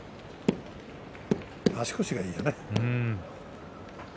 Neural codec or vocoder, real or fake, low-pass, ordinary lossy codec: none; real; none; none